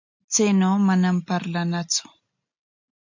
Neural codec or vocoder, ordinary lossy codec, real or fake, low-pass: none; MP3, 64 kbps; real; 7.2 kHz